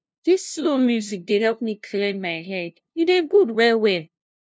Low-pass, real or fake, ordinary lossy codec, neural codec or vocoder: none; fake; none; codec, 16 kHz, 0.5 kbps, FunCodec, trained on LibriTTS, 25 frames a second